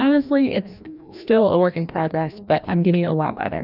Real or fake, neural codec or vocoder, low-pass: fake; codec, 16 kHz, 1 kbps, FreqCodec, larger model; 5.4 kHz